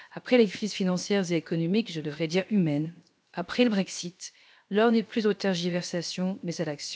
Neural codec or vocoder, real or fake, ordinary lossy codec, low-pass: codec, 16 kHz, 0.7 kbps, FocalCodec; fake; none; none